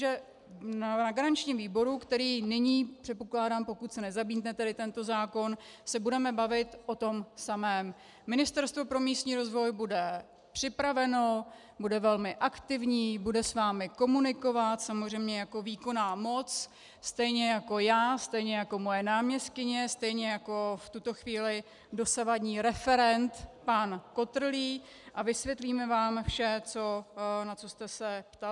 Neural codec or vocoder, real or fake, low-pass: none; real; 10.8 kHz